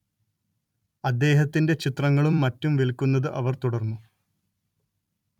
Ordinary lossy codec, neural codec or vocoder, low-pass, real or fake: none; vocoder, 44.1 kHz, 128 mel bands every 256 samples, BigVGAN v2; 19.8 kHz; fake